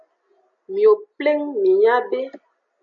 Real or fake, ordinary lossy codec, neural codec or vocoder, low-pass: real; AAC, 64 kbps; none; 7.2 kHz